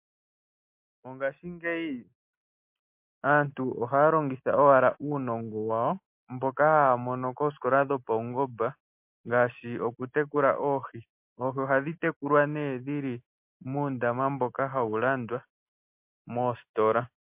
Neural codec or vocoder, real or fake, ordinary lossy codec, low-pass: none; real; MP3, 32 kbps; 3.6 kHz